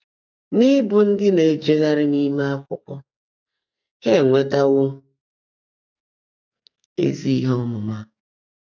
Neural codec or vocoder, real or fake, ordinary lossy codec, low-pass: codec, 44.1 kHz, 2.6 kbps, SNAC; fake; none; 7.2 kHz